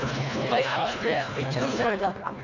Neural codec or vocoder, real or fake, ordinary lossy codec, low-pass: codec, 24 kHz, 1.5 kbps, HILCodec; fake; AAC, 48 kbps; 7.2 kHz